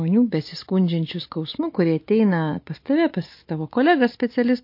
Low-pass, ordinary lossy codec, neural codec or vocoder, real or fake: 5.4 kHz; MP3, 32 kbps; none; real